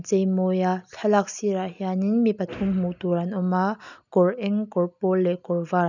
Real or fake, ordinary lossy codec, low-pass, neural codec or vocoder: real; none; 7.2 kHz; none